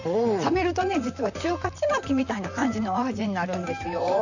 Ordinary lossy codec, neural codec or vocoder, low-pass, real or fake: none; vocoder, 44.1 kHz, 128 mel bands, Pupu-Vocoder; 7.2 kHz; fake